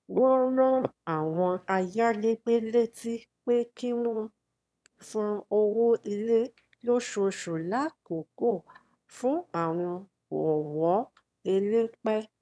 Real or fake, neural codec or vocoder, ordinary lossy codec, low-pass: fake; autoencoder, 22.05 kHz, a latent of 192 numbers a frame, VITS, trained on one speaker; none; none